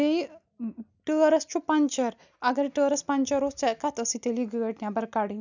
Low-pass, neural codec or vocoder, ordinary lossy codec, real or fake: 7.2 kHz; none; none; real